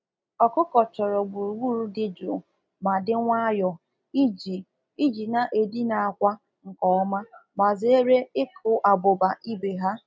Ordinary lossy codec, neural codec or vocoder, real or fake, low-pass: none; none; real; none